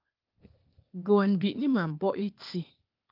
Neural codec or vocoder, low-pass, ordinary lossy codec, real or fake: codec, 16 kHz, 0.8 kbps, ZipCodec; 5.4 kHz; Opus, 24 kbps; fake